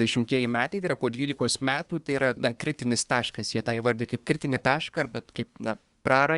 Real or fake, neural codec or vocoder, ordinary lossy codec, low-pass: fake; codec, 24 kHz, 1 kbps, SNAC; Opus, 64 kbps; 10.8 kHz